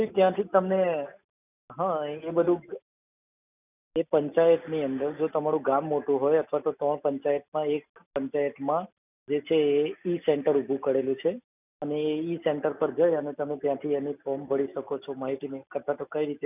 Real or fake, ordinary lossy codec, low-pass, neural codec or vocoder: real; none; 3.6 kHz; none